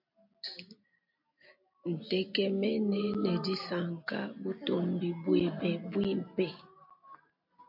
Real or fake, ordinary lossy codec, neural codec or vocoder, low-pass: real; MP3, 48 kbps; none; 5.4 kHz